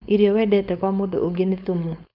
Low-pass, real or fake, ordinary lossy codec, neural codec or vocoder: 5.4 kHz; fake; none; codec, 16 kHz, 4.8 kbps, FACodec